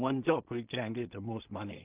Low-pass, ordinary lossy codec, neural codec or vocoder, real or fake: 3.6 kHz; Opus, 24 kbps; codec, 16 kHz in and 24 kHz out, 0.4 kbps, LongCat-Audio-Codec, two codebook decoder; fake